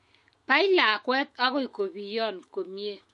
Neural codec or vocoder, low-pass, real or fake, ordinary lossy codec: autoencoder, 48 kHz, 128 numbers a frame, DAC-VAE, trained on Japanese speech; 14.4 kHz; fake; MP3, 48 kbps